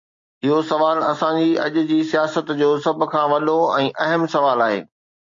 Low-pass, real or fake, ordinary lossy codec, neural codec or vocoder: 7.2 kHz; real; AAC, 48 kbps; none